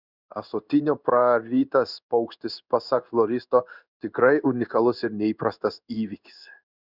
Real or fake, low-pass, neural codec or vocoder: fake; 5.4 kHz; codec, 16 kHz in and 24 kHz out, 1 kbps, XY-Tokenizer